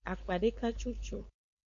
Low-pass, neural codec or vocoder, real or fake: 7.2 kHz; codec, 16 kHz, 4.8 kbps, FACodec; fake